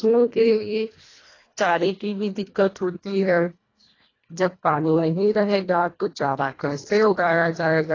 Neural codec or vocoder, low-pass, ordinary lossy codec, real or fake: codec, 24 kHz, 1.5 kbps, HILCodec; 7.2 kHz; AAC, 32 kbps; fake